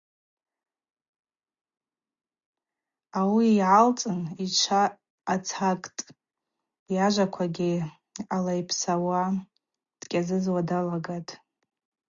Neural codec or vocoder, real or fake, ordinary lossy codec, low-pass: none; real; Opus, 64 kbps; 7.2 kHz